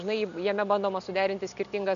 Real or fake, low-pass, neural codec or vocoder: real; 7.2 kHz; none